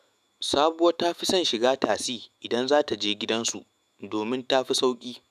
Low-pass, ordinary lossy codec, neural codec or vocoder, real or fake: 14.4 kHz; none; autoencoder, 48 kHz, 128 numbers a frame, DAC-VAE, trained on Japanese speech; fake